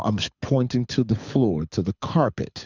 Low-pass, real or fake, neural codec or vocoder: 7.2 kHz; fake; codec, 24 kHz, 6 kbps, HILCodec